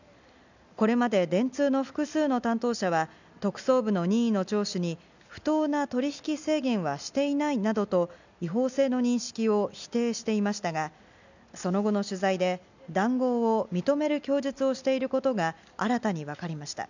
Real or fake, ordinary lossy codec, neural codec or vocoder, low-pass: real; none; none; 7.2 kHz